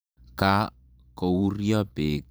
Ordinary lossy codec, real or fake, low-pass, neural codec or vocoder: none; fake; none; vocoder, 44.1 kHz, 128 mel bands every 512 samples, BigVGAN v2